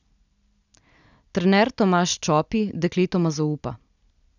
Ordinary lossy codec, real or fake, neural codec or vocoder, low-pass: none; real; none; 7.2 kHz